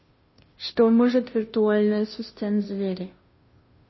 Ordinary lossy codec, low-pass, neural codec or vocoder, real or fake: MP3, 24 kbps; 7.2 kHz; codec, 16 kHz, 0.5 kbps, FunCodec, trained on Chinese and English, 25 frames a second; fake